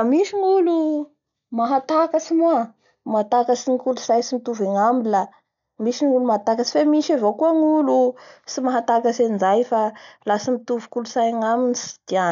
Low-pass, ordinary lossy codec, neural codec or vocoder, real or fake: 7.2 kHz; none; none; real